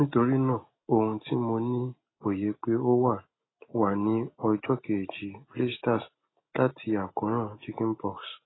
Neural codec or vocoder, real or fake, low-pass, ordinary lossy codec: none; real; 7.2 kHz; AAC, 16 kbps